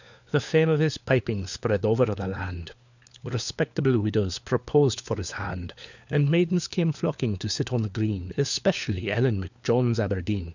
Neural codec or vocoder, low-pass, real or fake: codec, 16 kHz, 4 kbps, FunCodec, trained on LibriTTS, 50 frames a second; 7.2 kHz; fake